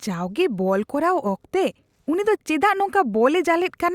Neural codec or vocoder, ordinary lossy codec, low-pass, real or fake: none; Opus, 64 kbps; 19.8 kHz; real